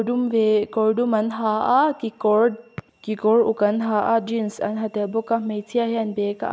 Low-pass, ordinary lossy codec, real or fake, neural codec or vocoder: none; none; real; none